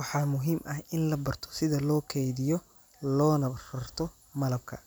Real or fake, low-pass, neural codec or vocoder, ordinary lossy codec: real; none; none; none